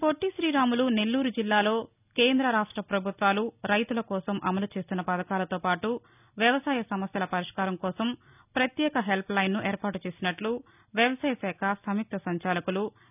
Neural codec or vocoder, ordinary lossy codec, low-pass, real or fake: none; none; 3.6 kHz; real